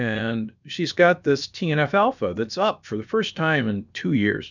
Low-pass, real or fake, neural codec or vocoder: 7.2 kHz; fake; codec, 16 kHz, about 1 kbps, DyCAST, with the encoder's durations